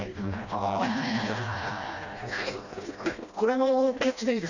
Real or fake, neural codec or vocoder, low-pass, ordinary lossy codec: fake; codec, 16 kHz, 1 kbps, FreqCodec, smaller model; 7.2 kHz; none